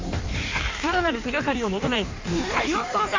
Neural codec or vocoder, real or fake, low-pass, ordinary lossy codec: codec, 16 kHz in and 24 kHz out, 1.1 kbps, FireRedTTS-2 codec; fake; 7.2 kHz; MP3, 48 kbps